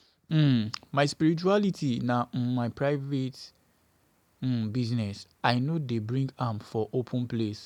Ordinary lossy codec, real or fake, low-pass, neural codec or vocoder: none; real; 19.8 kHz; none